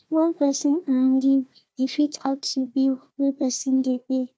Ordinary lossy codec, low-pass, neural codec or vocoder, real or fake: none; none; codec, 16 kHz, 1 kbps, FunCodec, trained on Chinese and English, 50 frames a second; fake